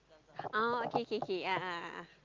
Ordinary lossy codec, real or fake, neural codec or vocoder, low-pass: Opus, 24 kbps; real; none; 7.2 kHz